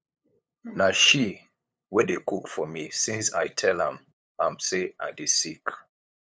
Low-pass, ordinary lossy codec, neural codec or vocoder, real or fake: none; none; codec, 16 kHz, 8 kbps, FunCodec, trained on LibriTTS, 25 frames a second; fake